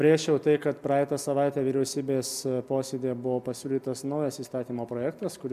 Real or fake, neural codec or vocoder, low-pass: real; none; 14.4 kHz